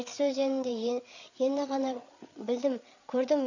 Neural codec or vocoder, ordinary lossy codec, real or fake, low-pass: codec, 16 kHz, 8 kbps, FreqCodec, larger model; none; fake; 7.2 kHz